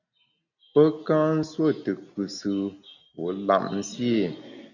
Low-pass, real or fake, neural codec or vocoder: 7.2 kHz; real; none